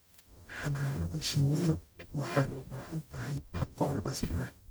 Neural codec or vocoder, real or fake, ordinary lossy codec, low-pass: codec, 44.1 kHz, 0.9 kbps, DAC; fake; none; none